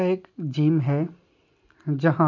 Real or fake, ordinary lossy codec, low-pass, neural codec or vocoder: real; none; 7.2 kHz; none